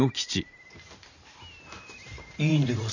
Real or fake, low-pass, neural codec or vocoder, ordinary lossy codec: fake; 7.2 kHz; vocoder, 44.1 kHz, 128 mel bands every 512 samples, BigVGAN v2; none